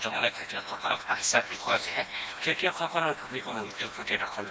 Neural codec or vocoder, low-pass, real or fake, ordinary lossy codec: codec, 16 kHz, 1 kbps, FreqCodec, smaller model; none; fake; none